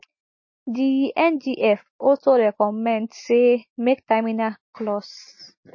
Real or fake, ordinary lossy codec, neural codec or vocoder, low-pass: real; MP3, 32 kbps; none; 7.2 kHz